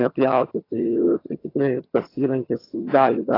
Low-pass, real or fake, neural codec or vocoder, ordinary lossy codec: 5.4 kHz; fake; vocoder, 22.05 kHz, 80 mel bands, HiFi-GAN; AAC, 24 kbps